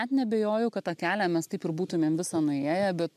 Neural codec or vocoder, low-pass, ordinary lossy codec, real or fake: none; 14.4 kHz; MP3, 96 kbps; real